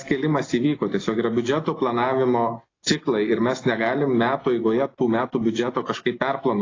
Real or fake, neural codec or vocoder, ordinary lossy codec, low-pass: real; none; AAC, 32 kbps; 7.2 kHz